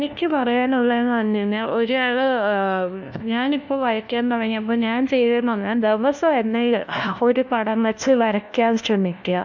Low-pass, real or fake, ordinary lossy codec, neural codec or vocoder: 7.2 kHz; fake; MP3, 64 kbps; codec, 16 kHz, 1 kbps, FunCodec, trained on LibriTTS, 50 frames a second